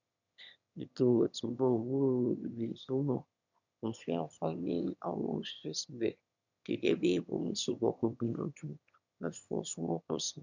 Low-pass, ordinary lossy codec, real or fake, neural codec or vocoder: 7.2 kHz; none; fake; autoencoder, 22.05 kHz, a latent of 192 numbers a frame, VITS, trained on one speaker